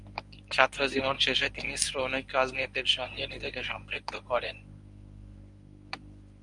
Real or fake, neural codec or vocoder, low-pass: fake; codec, 24 kHz, 0.9 kbps, WavTokenizer, medium speech release version 1; 10.8 kHz